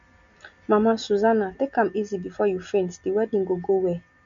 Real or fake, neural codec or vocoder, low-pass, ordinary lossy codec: real; none; 7.2 kHz; MP3, 48 kbps